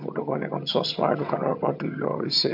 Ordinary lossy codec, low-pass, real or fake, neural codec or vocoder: MP3, 48 kbps; 5.4 kHz; fake; vocoder, 22.05 kHz, 80 mel bands, HiFi-GAN